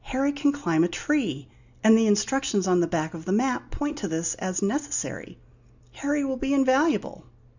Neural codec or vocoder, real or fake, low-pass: none; real; 7.2 kHz